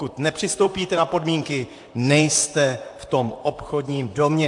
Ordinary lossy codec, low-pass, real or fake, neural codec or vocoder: AAC, 64 kbps; 10.8 kHz; fake; vocoder, 44.1 kHz, 128 mel bands, Pupu-Vocoder